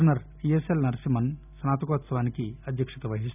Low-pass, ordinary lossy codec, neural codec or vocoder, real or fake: 3.6 kHz; none; none; real